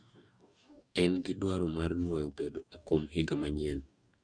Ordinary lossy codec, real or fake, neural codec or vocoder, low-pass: none; fake; codec, 44.1 kHz, 2.6 kbps, DAC; 9.9 kHz